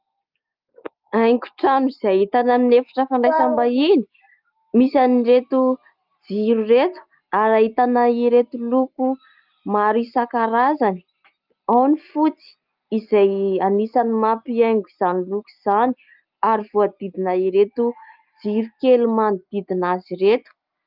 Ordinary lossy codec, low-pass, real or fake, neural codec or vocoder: Opus, 32 kbps; 5.4 kHz; real; none